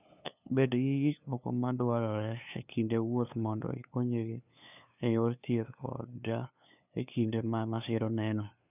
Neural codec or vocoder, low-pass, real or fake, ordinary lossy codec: codec, 16 kHz, 2 kbps, FunCodec, trained on LibriTTS, 25 frames a second; 3.6 kHz; fake; none